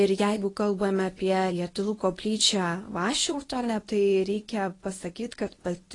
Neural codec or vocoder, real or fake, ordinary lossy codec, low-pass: codec, 24 kHz, 0.9 kbps, WavTokenizer, medium speech release version 1; fake; AAC, 32 kbps; 10.8 kHz